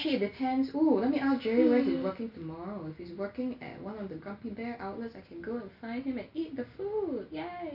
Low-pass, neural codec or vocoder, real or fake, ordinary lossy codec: 5.4 kHz; none; real; none